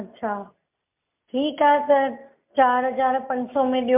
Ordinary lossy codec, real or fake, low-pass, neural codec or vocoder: MP3, 32 kbps; real; 3.6 kHz; none